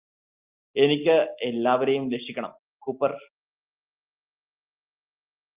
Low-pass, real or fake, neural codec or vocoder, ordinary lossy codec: 3.6 kHz; real; none; Opus, 32 kbps